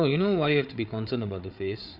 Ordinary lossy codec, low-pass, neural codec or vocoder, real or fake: Opus, 64 kbps; 5.4 kHz; codec, 16 kHz, 8 kbps, FreqCodec, larger model; fake